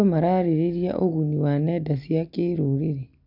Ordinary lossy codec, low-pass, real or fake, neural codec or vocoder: none; 5.4 kHz; real; none